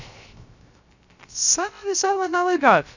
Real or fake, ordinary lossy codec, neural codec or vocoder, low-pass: fake; none; codec, 16 kHz, 0.3 kbps, FocalCodec; 7.2 kHz